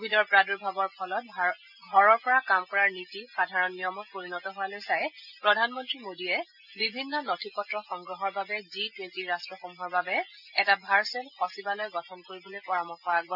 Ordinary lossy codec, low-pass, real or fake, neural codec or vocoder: none; 5.4 kHz; real; none